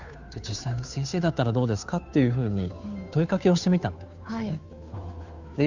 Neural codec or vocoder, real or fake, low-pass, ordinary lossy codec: codec, 16 kHz, 2 kbps, FunCodec, trained on Chinese and English, 25 frames a second; fake; 7.2 kHz; none